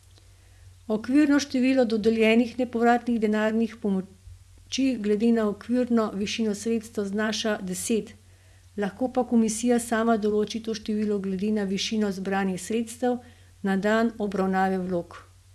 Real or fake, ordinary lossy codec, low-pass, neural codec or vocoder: fake; none; none; vocoder, 24 kHz, 100 mel bands, Vocos